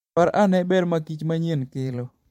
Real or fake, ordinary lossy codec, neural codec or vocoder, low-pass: fake; MP3, 64 kbps; vocoder, 44.1 kHz, 128 mel bands every 512 samples, BigVGAN v2; 19.8 kHz